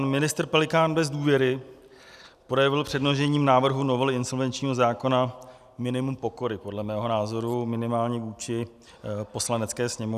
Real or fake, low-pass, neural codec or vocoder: real; 14.4 kHz; none